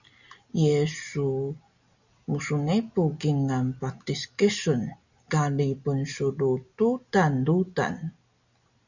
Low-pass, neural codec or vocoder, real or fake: 7.2 kHz; none; real